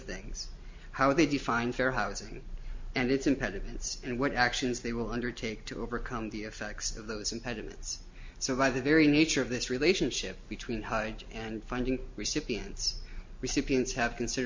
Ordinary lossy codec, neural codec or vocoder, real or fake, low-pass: MP3, 48 kbps; none; real; 7.2 kHz